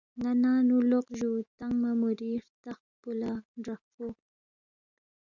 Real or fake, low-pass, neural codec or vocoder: real; 7.2 kHz; none